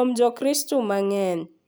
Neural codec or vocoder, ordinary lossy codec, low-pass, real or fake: none; none; none; real